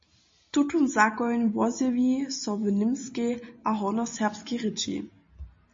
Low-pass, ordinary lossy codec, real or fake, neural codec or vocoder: 7.2 kHz; MP3, 32 kbps; real; none